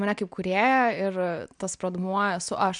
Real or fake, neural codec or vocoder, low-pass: real; none; 9.9 kHz